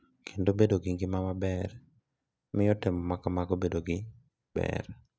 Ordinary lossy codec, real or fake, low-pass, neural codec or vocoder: none; real; none; none